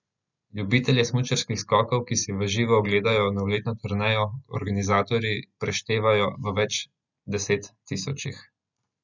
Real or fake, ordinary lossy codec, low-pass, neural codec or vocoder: real; none; 7.2 kHz; none